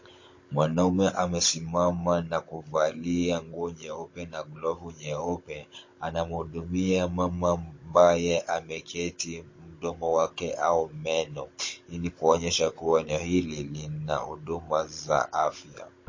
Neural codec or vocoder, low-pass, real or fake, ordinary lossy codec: codec, 16 kHz, 16 kbps, FunCodec, trained on Chinese and English, 50 frames a second; 7.2 kHz; fake; MP3, 32 kbps